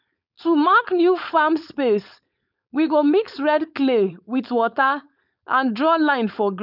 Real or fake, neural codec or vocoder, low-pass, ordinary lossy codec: fake; codec, 16 kHz, 4.8 kbps, FACodec; 5.4 kHz; none